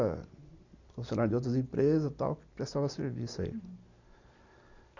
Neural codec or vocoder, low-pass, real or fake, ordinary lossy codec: none; 7.2 kHz; real; none